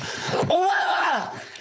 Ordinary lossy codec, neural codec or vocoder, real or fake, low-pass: none; codec, 16 kHz, 4.8 kbps, FACodec; fake; none